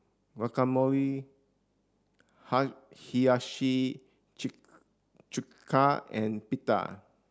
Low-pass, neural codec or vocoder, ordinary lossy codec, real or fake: none; none; none; real